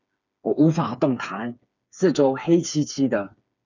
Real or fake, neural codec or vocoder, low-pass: fake; codec, 16 kHz, 4 kbps, FreqCodec, smaller model; 7.2 kHz